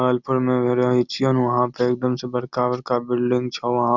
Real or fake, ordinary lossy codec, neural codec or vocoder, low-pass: real; none; none; 7.2 kHz